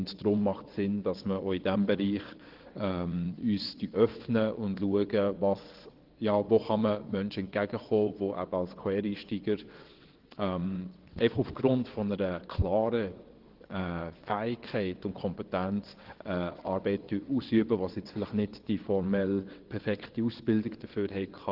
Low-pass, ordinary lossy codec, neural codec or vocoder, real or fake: 5.4 kHz; Opus, 16 kbps; vocoder, 22.05 kHz, 80 mel bands, WaveNeXt; fake